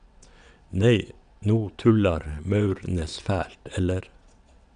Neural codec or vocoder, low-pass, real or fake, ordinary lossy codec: vocoder, 22.05 kHz, 80 mel bands, WaveNeXt; 9.9 kHz; fake; none